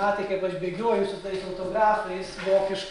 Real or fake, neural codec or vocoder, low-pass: real; none; 10.8 kHz